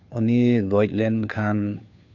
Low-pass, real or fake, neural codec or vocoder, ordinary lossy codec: 7.2 kHz; fake; codec, 16 kHz, 2 kbps, FunCodec, trained on Chinese and English, 25 frames a second; none